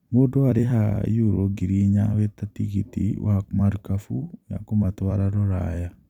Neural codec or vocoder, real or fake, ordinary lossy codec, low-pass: vocoder, 44.1 kHz, 128 mel bands every 256 samples, BigVGAN v2; fake; none; 19.8 kHz